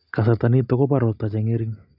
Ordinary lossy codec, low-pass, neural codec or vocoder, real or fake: none; 5.4 kHz; none; real